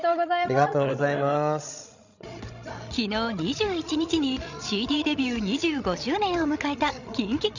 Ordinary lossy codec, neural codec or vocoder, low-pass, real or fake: none; codec, 16 kHz, 16 kbps, FreqCodec, larger model; 7.2 kHz; fake